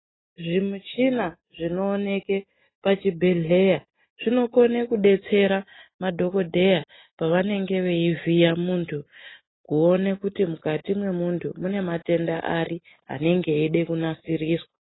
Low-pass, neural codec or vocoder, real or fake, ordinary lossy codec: 7.2 kHz; none; real; AAC, 16 kbps